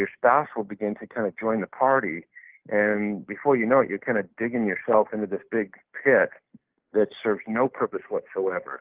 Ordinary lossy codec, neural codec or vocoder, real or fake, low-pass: Opus, 16 kbps; none; real; 3.6 kHz